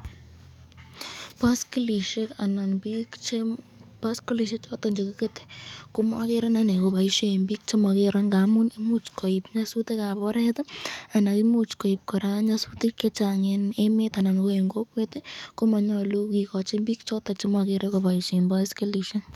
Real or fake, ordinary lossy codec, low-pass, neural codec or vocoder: fake; none; 19.8 kHz; codec, 44.1 kHz, 7.8 kbps, DAC